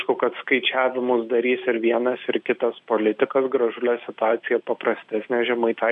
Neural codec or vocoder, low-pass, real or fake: none; 10.8 kHz; real